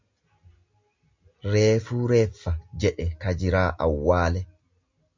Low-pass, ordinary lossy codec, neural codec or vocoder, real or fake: 7.2 kHz; MP3, 48 kbps; none; real